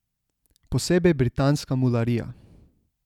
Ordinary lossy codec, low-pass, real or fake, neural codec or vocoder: none; 19.8 kHz; real; none